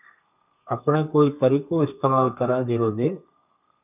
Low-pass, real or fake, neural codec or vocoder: 3.6 kHz; fake; codec, 32 kHz, 1.9 kbps, SNAC